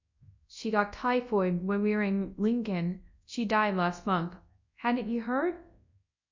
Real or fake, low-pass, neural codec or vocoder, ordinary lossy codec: fake; 7.2 kHz; codec, 24 kHz, 0.9 kbps, WavTokenizer, large speech release; MP3, 64 kbps